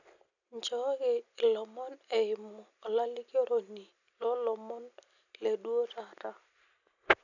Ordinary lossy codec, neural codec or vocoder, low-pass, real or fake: none; none; 7.2 kHz; real